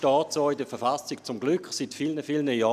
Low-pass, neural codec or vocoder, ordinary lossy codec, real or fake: 14.4 kHz; none; none; real